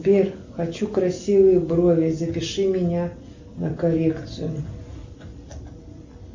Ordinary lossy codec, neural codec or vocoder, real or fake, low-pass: MP3, 64 kbps; none; real; 7.2 kHz